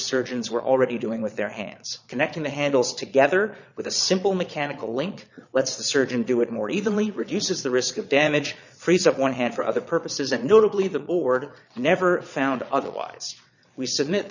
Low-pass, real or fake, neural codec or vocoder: 7.2 kHz; fake; vocoder, 22.05 kHz, 80 mel bands, Vocos